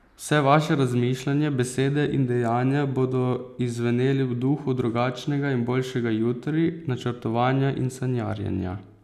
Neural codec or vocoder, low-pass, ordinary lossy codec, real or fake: none; 14.4 kHz; none; real